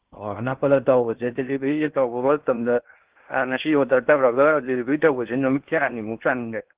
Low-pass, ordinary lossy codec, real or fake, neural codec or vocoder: 3.6 kHz; Opus, 16 kbps; fake; codec, 16 kHz in and 24 kHz out, 0.6 kbps, FocalCodec, streaming, 2048 codes